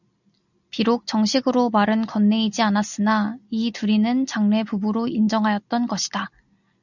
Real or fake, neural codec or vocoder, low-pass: real; none; 7.2 kHz